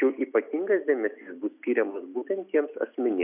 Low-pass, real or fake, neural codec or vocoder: 3.6 kHz; real; none